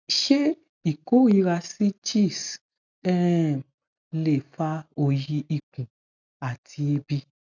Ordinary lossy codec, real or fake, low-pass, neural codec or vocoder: none; real; 7.2 kHz; none